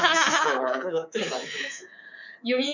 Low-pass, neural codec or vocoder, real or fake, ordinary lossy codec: 7.2 kHz; codec, 24 kHz, 3.1 kbps, DualCodec; fake; none